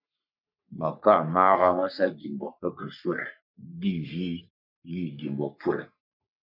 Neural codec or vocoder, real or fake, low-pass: codec, 44.1 kHz, 3.4 kbps, Pupu-Codec; fake; 5.4 kHz